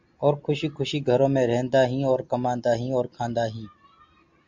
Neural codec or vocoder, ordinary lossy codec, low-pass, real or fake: none; MP3, 64 kbps; 7.2 kHz; real